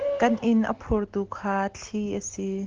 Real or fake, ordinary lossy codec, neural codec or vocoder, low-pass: real; Opus, 16 kbps; none; 7.2 kHz